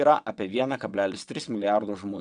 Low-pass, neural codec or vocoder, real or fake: 9.9 kHz; vocoder, 22.05 kHz, 80 mel bands, WaveNeXt; fake